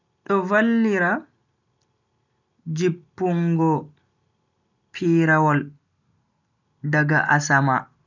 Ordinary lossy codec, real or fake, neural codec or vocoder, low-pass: none; real; none; 7.2 kHz